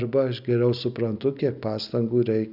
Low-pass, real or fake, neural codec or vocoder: 5.4 kHz; real; none